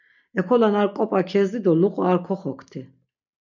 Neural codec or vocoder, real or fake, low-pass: none; real; 7.2 kHz